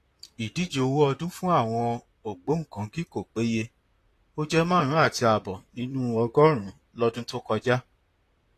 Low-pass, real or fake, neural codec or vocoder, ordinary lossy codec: 14.4 kHz; fake; vocoder, 44.1 kHz, 128 mel bands, Pupu-Vocoder; AAC, 48 kbps